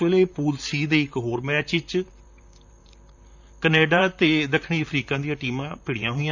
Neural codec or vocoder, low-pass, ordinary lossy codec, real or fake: vocoder, 44.1 kHz, 128 mel bands, Pupu-Vocoder; 7.2 kHz; none; fake